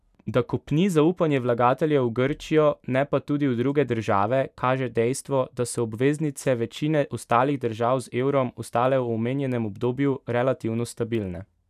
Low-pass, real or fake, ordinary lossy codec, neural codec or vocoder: 14.4 kHz; fake; none; vocoder, 44.1 kHz, 128 mel bands every 512 samples, BigVGAN v2